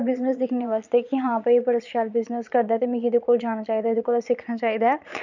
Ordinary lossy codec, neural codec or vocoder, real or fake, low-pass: none; vocoder, 22.05 kHz, 80 mel bands, Vocos; fake; 7.2 kHz